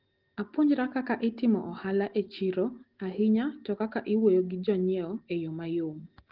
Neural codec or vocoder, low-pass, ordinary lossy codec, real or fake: none; 5.4 kHz; Opus, 16 kbps; real